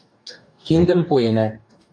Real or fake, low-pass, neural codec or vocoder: fake; 9.9 kHz; codec, 44.1 kHz, 2.6 kbps, DAC